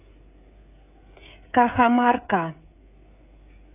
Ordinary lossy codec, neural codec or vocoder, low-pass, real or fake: AAC, 24 kbps; codec, 16 kHz, 16 kbps, FreqCodec, smaller model; 3.6 kHz; fake